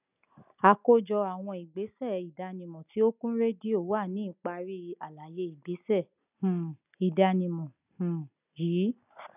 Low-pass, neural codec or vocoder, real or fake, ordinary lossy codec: 3.6 kHz; none; real; none